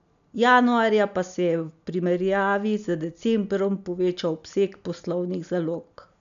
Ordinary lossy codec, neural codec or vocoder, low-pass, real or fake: none; none; 7.2 kHz; real